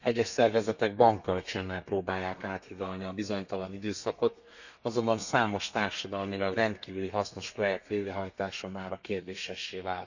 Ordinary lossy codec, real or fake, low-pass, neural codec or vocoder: none; fake; 7.2 kHz; codec, 32 kHz, 1.9 kbps, SNAC